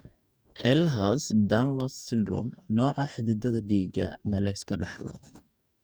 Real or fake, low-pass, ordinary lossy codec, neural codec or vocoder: fake; none; none; codec, 44.1 kHz, 2.6 kbps, DAC